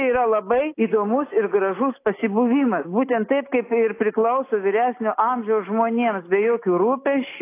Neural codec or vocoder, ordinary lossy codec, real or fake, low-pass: none; AAC, 24 kbps; real; 3.6 kHz